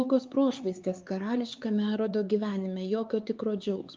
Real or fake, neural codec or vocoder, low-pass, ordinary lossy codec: fake; codec, 16 kHz, 4 kbps, X-Codec, HuBERT features, trained on LibriSpeech; 7.2 kHz; Opus, 32 kbps